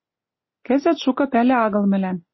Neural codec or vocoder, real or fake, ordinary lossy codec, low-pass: none; real; MP3, 24 kbps; 7.2 kHz